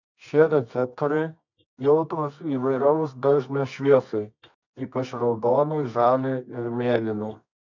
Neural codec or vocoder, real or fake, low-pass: codec, 24 kHz, 0.9 kbps, WavTokenizer, medium music audio release; fake; 7.2 kHz